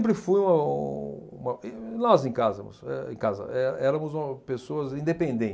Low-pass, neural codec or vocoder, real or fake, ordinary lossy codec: none; none; real; none